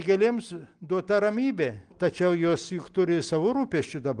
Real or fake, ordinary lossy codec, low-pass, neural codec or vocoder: real; Opus, 24 kbps; 9.9 kHz; none